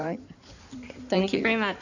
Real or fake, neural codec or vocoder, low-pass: fake; codec, 16 kHz in and 24 kHz out, 2.2 kbps, FireRedTTS-2 codec; 7.2 kHz